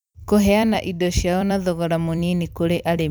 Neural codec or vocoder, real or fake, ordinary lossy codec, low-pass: vocoder, 44.1 kHz, 128 mel bands every 256 samples, BigVGAN v2; fake; none; none